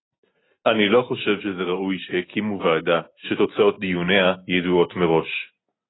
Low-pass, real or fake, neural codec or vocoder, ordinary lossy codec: 7.2 kHz; real; none; AAC, 16 kbps